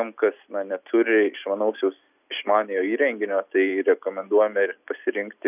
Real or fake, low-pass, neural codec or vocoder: real; 3.6 kHz; none